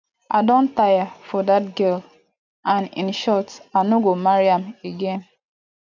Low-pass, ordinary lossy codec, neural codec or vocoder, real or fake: 7.2 kHz; none; none; real